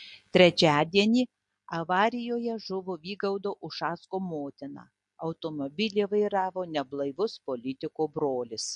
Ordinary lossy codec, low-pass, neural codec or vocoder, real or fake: MP3, 48 kbps; 10.8 kHz; none; real